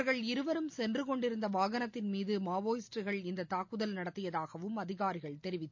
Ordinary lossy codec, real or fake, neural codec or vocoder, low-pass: MP3, 48 kbps; real; none; 7.2 kHz